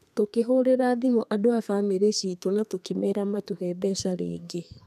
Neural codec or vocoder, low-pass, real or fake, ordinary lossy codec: codec, 32 kHz, 1.9 kbps, SNAC; 14.4 kHz; fake; none